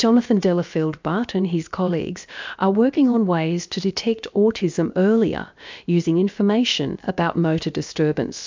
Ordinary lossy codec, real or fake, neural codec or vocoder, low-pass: MP3, 64 kbps; fake; codec, 16 kHz, about 1 kbps, DyCAST, with the encoder's durations; 7.2 kHz